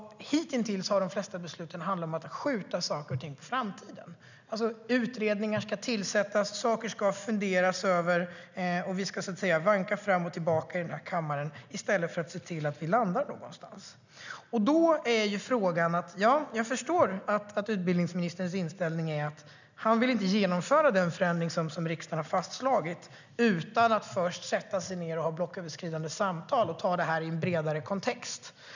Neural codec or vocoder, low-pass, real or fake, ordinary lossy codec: none; 7.2 kHz; real; none